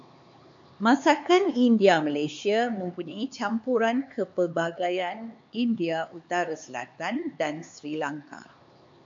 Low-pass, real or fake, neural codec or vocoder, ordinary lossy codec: 7.2 kHz; fake; codec, 16 kHz, 4 kbps, X-Codec, HuBERT features, trained on LibriSpeech; MP3, 48 kbps